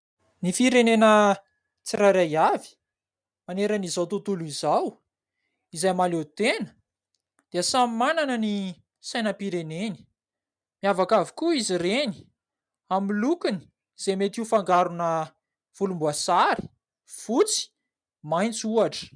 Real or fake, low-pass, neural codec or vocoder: real; 9.9 kHz; none